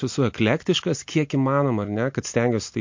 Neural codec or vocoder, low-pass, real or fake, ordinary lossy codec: none; 7.2 kHz; real; MP3, 48 kbps